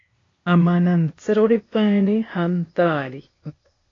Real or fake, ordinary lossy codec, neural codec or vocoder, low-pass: fake; AAC, 32 kbps; codec, 16 kHz, 0.8 kbps, ZipCodec; 7.2 kHz